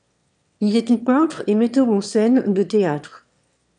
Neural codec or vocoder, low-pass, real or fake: autoencoder, 22.05 kHz, a latent of 192 numbers a frame, VITS, trained on one speaker; 9.9 kHz; fake